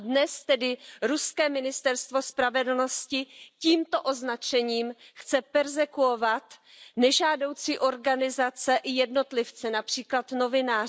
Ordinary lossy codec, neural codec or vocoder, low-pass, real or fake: none; none; none; real